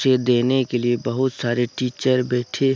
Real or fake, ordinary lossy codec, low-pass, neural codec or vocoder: real; none; none; none